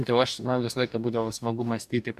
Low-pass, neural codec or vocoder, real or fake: 14.4 kHz; codec, 44.1 kHz, 2.6 kbps, DAC; fake